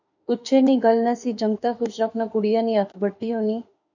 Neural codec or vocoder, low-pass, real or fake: autoencoder, 48 kHz, 32 numbers a frame, DAC-VAE, trained on Japanese speech; 7.2 kHz; fake